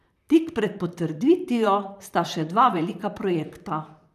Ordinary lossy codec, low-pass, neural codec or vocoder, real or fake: none; 14.4 kHz; vocoder, 44.1 kHz, 128 mel bands, Pupu-Vocoder; fake